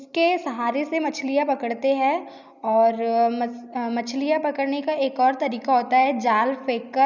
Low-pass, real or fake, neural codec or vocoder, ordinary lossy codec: 7.2 kHz; real; none; none